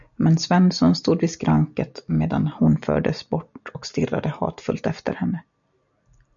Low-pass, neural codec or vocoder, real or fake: 7.2 kHz; none; real